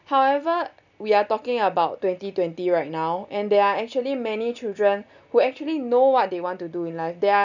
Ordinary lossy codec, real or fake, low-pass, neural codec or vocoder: none; real; 7.2 kHz; none